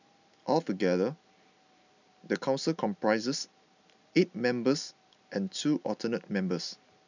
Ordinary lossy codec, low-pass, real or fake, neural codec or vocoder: none; 7.2 kHz; real; none